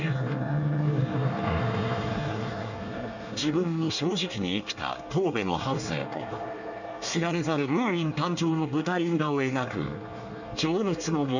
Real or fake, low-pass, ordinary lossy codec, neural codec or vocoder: fake; 7.2 kHz; none; codec, 24 kHz, 1 kbps, SNAC